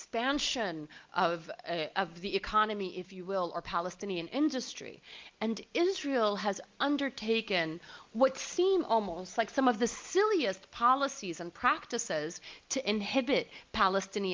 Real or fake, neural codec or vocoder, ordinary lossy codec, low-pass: real; none; Opus, 24 kbps; 7.2 kHz